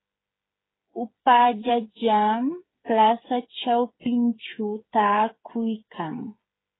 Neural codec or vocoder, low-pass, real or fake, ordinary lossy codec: codec, 16 kHz, 8 kbps, FreqCodec, smaller model; 7.2 kHz; fake; AAC, 16 kbps